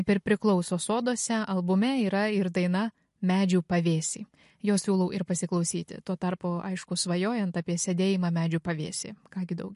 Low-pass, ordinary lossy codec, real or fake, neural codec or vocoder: 14.4 kHz; MP3, 48 kbps; real; none